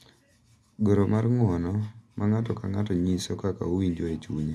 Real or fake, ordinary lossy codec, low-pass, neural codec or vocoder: real; none; none; none